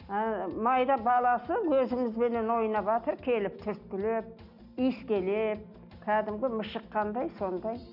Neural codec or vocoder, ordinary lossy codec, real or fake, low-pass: none; none; real; 5.4 kHz